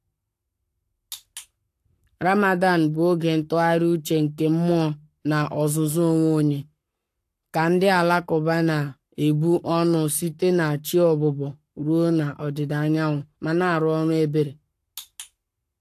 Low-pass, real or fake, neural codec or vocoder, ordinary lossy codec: 14.4 kHz; fake; codec, 44.1 kHz, 7.8 kbps, Pupu-Codec; AAC, 64 kbps